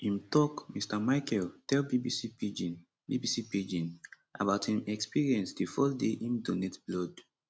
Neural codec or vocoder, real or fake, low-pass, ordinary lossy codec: none; real; none; none